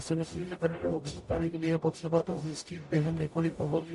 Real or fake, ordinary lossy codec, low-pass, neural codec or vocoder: fake; MP3, 48 kbps; 14.4 kHz; codec, 44.1 kHz, 0.9 kbps, DAC